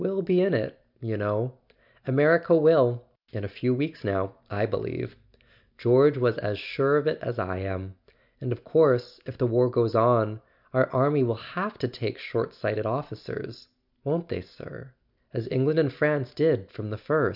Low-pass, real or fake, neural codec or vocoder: 5.4 kHz; real; none